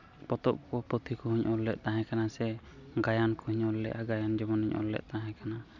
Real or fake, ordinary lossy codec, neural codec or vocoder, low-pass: real; none; none; 7.2 kHz